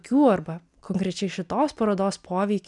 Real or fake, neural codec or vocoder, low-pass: real; none; 10.8 kHz